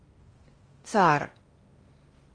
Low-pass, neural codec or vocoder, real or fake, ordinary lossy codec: 9.9 kHz; codec, 16 kHz in and 24 kHz out, 0.8 kbps, FocalCodec, streaming, 65536 codes; fake; Opus, 24 kbps